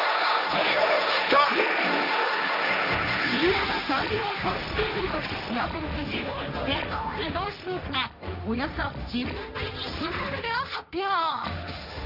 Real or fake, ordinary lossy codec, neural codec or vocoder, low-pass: fake; none; codec, 16 kHz, 1.1 kbps, Voila-Tokenizer; 5.4 kHz